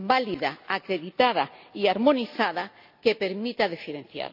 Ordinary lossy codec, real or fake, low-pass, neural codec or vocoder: none; real; 5.4 kHz; none